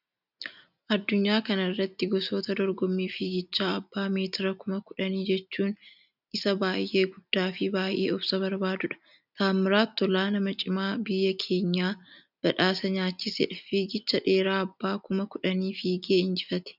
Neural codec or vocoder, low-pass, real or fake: none; 5.4 kHz; real